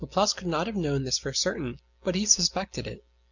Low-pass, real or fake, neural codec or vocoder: 7.2 kHz; real; none